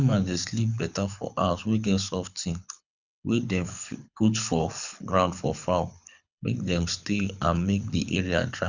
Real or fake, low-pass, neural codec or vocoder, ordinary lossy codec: fake; 7.2 kHz; codec, 24 kHz, 6 kbps, HILCodec; none